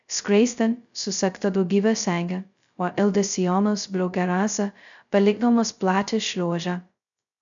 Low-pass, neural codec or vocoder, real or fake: 7.2 kHz; codec, 16 kHz, 0.2 kbps, FocalCodec; fake